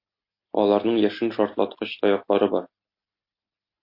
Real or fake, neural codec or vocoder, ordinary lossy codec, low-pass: real; none; MP3, 32 kbps; 5.4 kHz